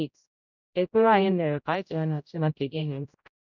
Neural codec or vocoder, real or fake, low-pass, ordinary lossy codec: codec, 16 kHz, 0.5 kbps, X-Codec, HuBERT features, trained on general audio; fake; 7.2 kHz; none